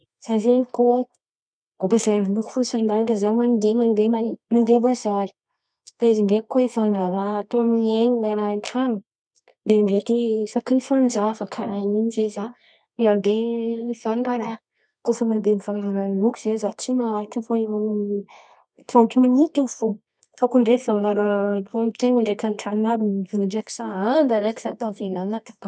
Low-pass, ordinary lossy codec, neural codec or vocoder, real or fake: 9.9 kHz; none; codec, 24 kHz, 0.9 kbps, WavTokenizer, medium music audio release; fake